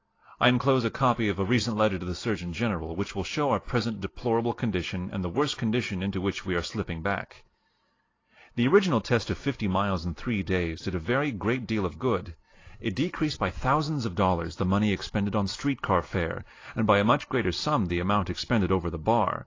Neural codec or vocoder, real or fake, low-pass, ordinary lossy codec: none; real; 7.2 kHz; AAC, 32 kbps